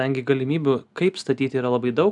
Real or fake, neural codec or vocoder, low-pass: real; none; 10.8 kHz